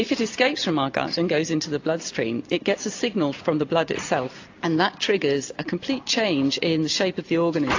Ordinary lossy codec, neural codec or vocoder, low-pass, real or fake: AAC, 48 kbps; none; 7.2 kHz; real